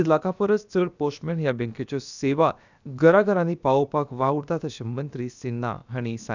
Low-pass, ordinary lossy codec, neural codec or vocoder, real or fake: 7.2 kHz; none; codec, 16 kHz, about 1 kbps, DyCAST, with the encoder's durations; fake